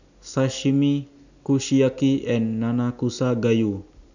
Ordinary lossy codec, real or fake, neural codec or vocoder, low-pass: none; real; none; 7.2 kHz